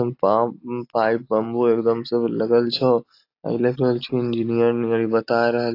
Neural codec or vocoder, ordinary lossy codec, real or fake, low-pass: none; AAC, 32 kbps; real; 5.4 kHz